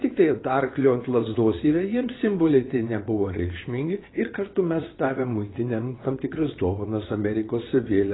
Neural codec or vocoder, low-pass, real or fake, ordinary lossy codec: vocoder, 22.05 kHz, 80 mel bands, WaveNeXt; 7.2 kHz; fake; AAC, 16 kbps